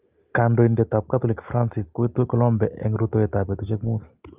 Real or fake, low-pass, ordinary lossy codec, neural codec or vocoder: real; 3.6 kHz; Opus, 24 kbps; none